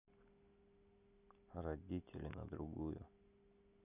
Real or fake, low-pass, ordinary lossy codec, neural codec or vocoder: real; 3.6 kHz; none; none